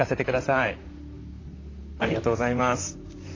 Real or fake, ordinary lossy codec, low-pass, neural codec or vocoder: fake; AAC, 32 kbps; 7.2 kHz; vocoder, 44.1 kHz, 128 mel bands, Pupu-Vocoder